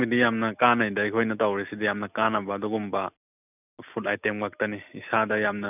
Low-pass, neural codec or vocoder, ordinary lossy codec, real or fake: 3.6 kHz; none; none; real